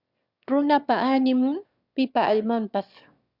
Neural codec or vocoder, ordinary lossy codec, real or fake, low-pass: autoencoder, 22.05 kHz, a latent of 192 numbers a frame, VITS, trained on one speaker; Opus, 64 kbps; fake; 5.4 kHz